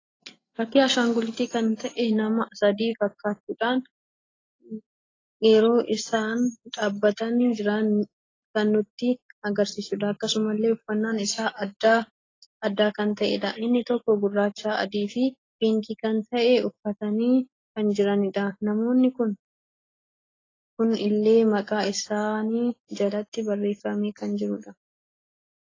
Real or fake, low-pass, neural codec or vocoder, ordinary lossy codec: real; 7.2 kHz; none; AAC, 32 kbps